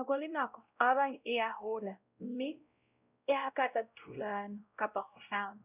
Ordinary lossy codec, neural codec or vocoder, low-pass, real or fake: none; codec, 16 kHz, 0.5 kbps, X-Codec, WavLM features, trained on Multilingual LibriSpeech; 3.6 kHz; fake